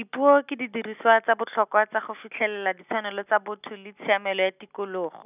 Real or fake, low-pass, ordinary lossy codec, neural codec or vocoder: real; 3.6 kHz; none; none